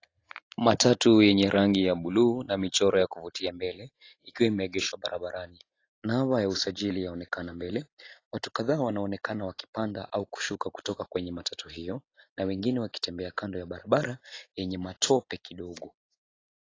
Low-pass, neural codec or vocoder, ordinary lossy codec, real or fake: 7.2 kHz; none; AAC, 32 kbps; real